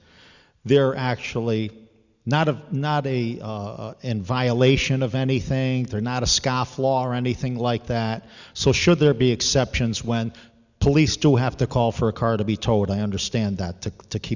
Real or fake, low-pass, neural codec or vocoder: real; 7.2 kHz; none